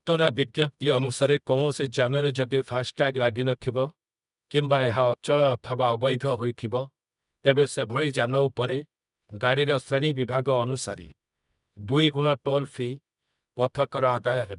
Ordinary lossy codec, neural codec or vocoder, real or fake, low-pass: none; codec, 24 kHz, 0.9 kbps, WavTokenizer, medium music audio release; fake; 10.8 kHz